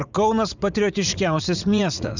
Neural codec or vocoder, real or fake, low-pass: vocoder, 44.1 kHz, 80 mel bands, Vocos; fake; 7.2 kHz